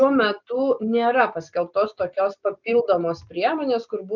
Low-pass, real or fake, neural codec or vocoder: 7.2 kHz; real; none